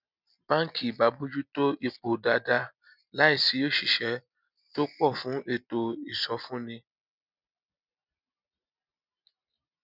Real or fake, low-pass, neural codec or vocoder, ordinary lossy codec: real; 5.4 kHz; none; none